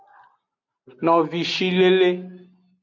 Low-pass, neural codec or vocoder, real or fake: 7.2 kHz; none; real